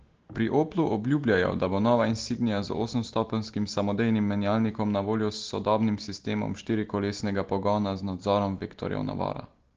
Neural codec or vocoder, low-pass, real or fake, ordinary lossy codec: none; 7.2 kHz; real; Opus, 16 kbps